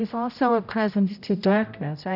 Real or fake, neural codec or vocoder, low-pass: fake; codec, 16 kHz, 0.5 kbps, X-Codec, HuBERT features, trained on general audio; 5.4 kHz